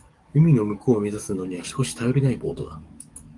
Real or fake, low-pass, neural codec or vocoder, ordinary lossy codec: fake; 10.8 kHz; codec, 44.1 kHz, 7.8 kbps, DAC; Opus, 32 kbps